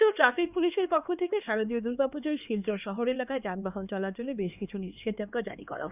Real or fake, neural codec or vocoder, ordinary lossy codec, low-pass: fake; codec, 16 kHz, 2 kbps, X-Codec, HuBERT features, trained on LibriSpeech; none; 3.6 kHz